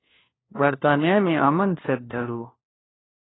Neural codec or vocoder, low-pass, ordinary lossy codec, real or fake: codec, 16 kHz, 1 kbps, FunCodec, trained on LibriTTS, 50 frames a second; 7.2 kHz; AAC, 16 kbps; fake